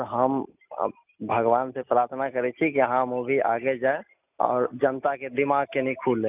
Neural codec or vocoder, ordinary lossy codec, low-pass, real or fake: none; none; 3.6 kHz; real